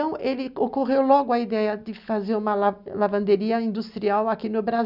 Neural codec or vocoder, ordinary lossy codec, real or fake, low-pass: none; none; real; 5.4 kHz